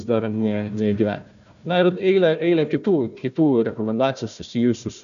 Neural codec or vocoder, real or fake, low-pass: codec, 16 kHz, 1 kbps, FunCodec, trained on Chinese and English, 50 frames a second; fake; 7.2 kHz